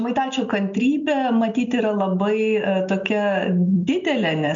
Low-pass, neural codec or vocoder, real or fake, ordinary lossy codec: 7.2 kHz; none; real; MP3, 64 kbps